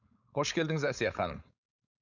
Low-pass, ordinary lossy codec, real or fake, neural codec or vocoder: 7.2 kHz; none; fake; codec, 16 kHz, 4.8 kbps, FACodec